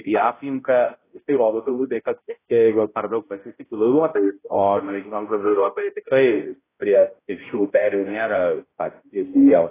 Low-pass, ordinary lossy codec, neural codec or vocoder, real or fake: 3.6 kHz; AAC, 16 kbps; codec, 16 kHz, 0.5 kbps, X-Codec, HuBERT features, trained on balanced general audio; fake